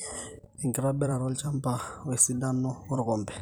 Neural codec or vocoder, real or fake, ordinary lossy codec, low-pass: vocoder, 44.1 kHz, 128 mel bands every 256 samples, BigVGAN v2; fake; none; none